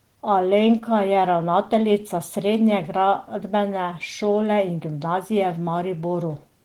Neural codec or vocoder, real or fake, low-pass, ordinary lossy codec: none; real; 19.8 kHz; Opus, 16 kbps